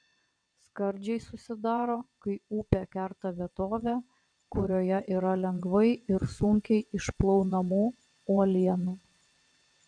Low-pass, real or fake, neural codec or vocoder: 9.9 kHz; fake; vocoder, 22.05 kHz, 80 mel bands, WaveNeXt